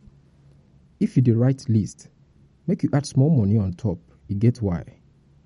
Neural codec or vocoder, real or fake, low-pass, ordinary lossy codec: none; real; 19.8 kHz; MP3, 48 kbps